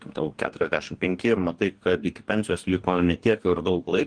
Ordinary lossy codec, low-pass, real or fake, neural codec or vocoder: Opus, 24 kbps; 9.9 kHz; fake; codec, 44.1 kHz, 2.6 kbps, DAC